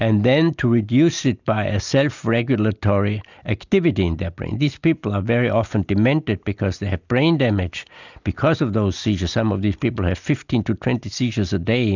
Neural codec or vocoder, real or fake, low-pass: none; real; 7.2 kHz